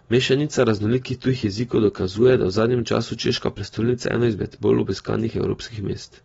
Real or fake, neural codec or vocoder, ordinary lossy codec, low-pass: real; none; AAC, 24 kbps; 19.8 kHz